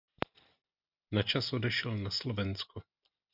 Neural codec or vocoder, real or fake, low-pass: none; real; 5.4 kHz